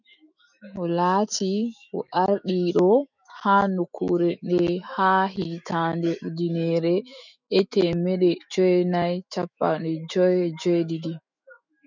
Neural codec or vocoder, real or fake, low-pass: autoencoder, 48 kHz, 128 numbers a frame, DAC-VAE, trained on Japanese speech; fake; 7.2 kHz